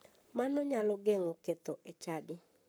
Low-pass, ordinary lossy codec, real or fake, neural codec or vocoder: none; none; fake; codec, 44.1 kHz, 7.8 kbps, Pupu-Codec